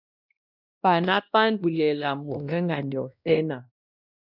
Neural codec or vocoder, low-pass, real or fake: codec, 16 kHz, 1 kbps, X-Codec, WavLM features, trained on Multilingual LibriSpeech; 5.4 kHz; fake